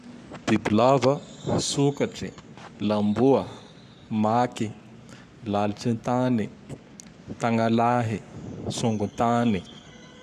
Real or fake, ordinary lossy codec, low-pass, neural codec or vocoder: fake; none; 14.4 kHz; codec, 44.1 kHz, 7.8 kbps, Pupu-Codec